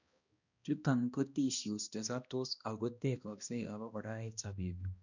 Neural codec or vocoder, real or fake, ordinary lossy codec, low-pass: codec, 16 kHz, 1 kbps, X-Codec, HuBERT features, trained on balanced general audio; fake; none; 7.2 kHz